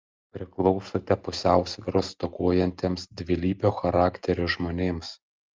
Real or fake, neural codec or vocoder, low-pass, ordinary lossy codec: real; none; 7.2 kHz; Opus, 32 kbps